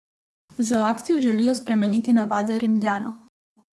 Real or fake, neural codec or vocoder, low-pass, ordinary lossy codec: fake; codec, 24 kHz, 1 kbps, SNAC; none; none